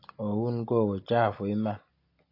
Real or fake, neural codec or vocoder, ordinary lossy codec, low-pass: real; none; AAC, 32 kbps; 5.4 kHz